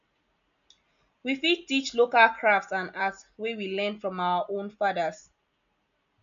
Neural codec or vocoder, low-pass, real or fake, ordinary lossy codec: none; 7.2 kHz; real; none